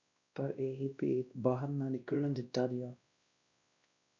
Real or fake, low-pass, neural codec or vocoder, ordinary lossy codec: fake; 7.2 kHz; codec, 16 kHz, 1 kbps, X-Codec, WavLM features, trained on Multilingual LibriSpeech; MP3, 64 kbps